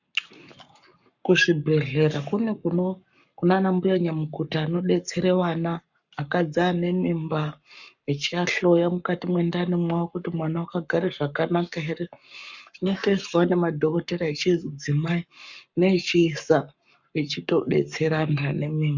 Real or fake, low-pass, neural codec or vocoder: fake; 7.2 kHz; codec, 44.1 kHz, 7.8 kbps, Pupu-Codec